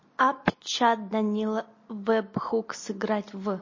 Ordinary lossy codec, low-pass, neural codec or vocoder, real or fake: MP3, 32 kbps; 7.2 kHz; none; real